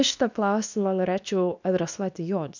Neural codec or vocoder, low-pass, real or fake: codec, 24 kHz, 0.9 kbps, WavTokenizer, medium speech release version 2; 7.2 kHz; fake